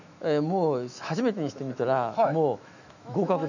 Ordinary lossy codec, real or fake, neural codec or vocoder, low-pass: none; fake; autoencoder, 48 kHz, 128 numbers a frame, DAC-VAE, trained on Japanese speech; 7.2 kHz